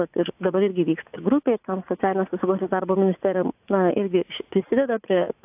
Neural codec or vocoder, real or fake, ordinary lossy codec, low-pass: vocoder, 44.1 kHz, 80 mel bands, Vocos; fake; AAC, 32 kbps; 3.6 kHz